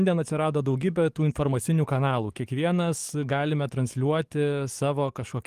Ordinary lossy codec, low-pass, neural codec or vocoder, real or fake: Opus, 24 kbps; 14.4 kHz; codec, 44.1 kHz, 7.8 kbps, DAC; fake